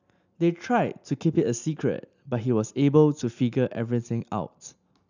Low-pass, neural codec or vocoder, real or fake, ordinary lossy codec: 7.2 kHz; none; real; none